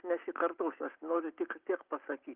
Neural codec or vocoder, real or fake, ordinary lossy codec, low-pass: none; real; Opus, 32 kbps; 3.6 kHz